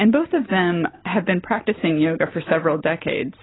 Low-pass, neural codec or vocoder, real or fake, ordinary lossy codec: 7.2 kHz; none; real; AAC, 16 kbps